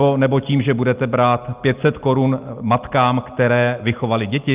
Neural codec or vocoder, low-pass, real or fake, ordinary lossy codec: none; 3.6 kHz; real; Opus, 24 kbps